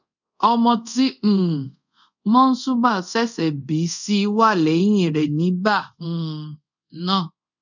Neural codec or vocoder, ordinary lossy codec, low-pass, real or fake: codec, 24 kHz, 0.5 kbps, DualCodec; none; 7.2 kHz; fake